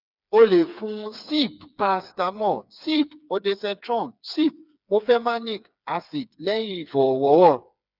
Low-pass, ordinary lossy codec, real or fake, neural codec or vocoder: 5.4 kHz; none; fake; codec, 16 kHz, 4 kbps, FreqCodec, smaller model